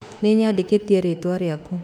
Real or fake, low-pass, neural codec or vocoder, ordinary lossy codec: fake; 19.8 kHz; autoencoder, 48 kHz, 32 numbers a frame, DAC-VAE, trained on Japanese speech; none